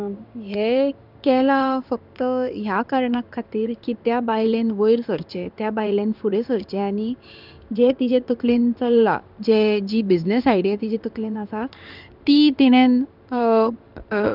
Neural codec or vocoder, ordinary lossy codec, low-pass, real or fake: codec, 16 kHz in and 24 kHz out, 1 kbps, XY-Tokenizer; none; 5.4 kHz; fake